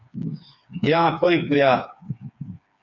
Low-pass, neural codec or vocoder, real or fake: 7.2 kHz; codec, 16 kHz, 4 kbps, FreqCodec, smaller model; fake